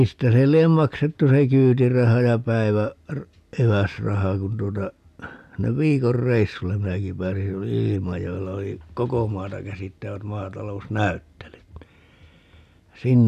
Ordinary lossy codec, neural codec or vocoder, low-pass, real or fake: none; none; 14.4 kHz; real